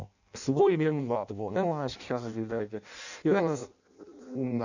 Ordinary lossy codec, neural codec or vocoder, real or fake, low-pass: none; codec, 16 kHz in and 24 kHz out, 0.6 kbps, FireRedTTS-2 codec; fake; 7.2 kHz